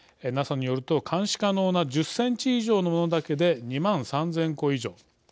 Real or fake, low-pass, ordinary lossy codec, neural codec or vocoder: real; none; none; none